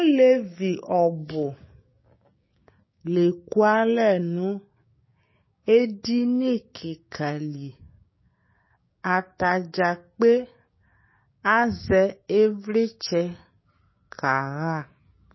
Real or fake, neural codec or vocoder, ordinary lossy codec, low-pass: fake; codec, 16 kHz, 6 kbps, DAC; MP3, 24 kbps; 7.2 kHz